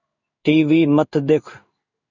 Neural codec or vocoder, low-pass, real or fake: codec, 16 kHz in and 24 kHz out, 1 kbps, XY-Tokenizer; 7.2 kHz; fake